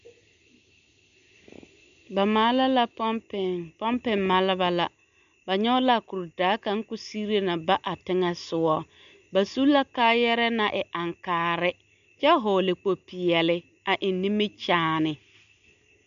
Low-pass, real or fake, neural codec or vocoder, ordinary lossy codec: 7.2 kHz; real; none; AAC, 96 kbps